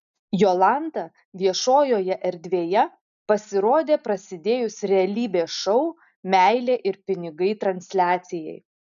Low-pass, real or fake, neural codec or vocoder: 7.2 kHz; real; none